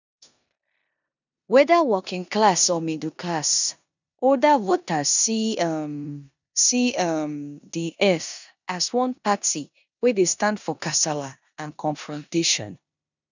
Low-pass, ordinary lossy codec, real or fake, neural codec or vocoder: 7.2 kHz; none; fake; codec, 16 kHz in and 24 kHz out, 0.9 kbps, LongCat-Audio-Codec, four codebook decoder